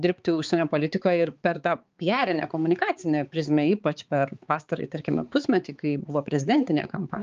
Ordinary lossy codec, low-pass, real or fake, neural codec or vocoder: Opus, 24 kbps; 7.2 kHz; fake; codec, 16 kHz, 4 kbps, X-Codec, HuBERT features, trained on balanced general audio